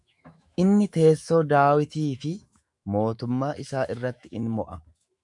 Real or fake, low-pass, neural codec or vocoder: fake; 10.8 kHz; autoencoder, 48 kHz, 128 numbers a frame, DAC-VAE, trained on Japanese speech